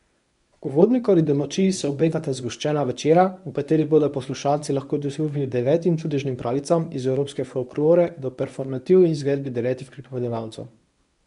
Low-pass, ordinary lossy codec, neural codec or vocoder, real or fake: 10.8 kHz; none; codec, 24 kHz, 0.9 kbps, WavTokenizer, medium speech release version 1; fake